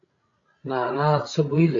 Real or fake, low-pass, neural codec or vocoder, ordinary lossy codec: fake; 7.2 kHz; codec, 16 kHz, 16 kbps, FreqCodec, larger model; AAC, 48 kbps